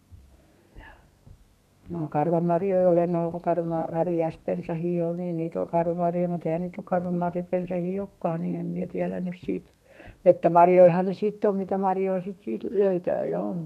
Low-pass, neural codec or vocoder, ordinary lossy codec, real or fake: 14.4 kHz; codec, 32 kHz, 1.9 kbps, SNAC; none; fake